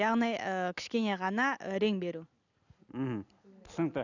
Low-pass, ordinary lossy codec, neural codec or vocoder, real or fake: 7.2 kHz; none; none; real